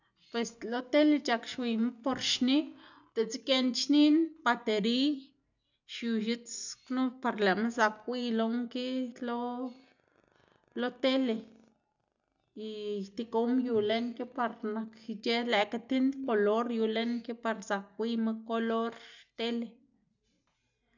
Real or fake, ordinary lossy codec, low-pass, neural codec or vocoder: real; none; 7.2 kHz; none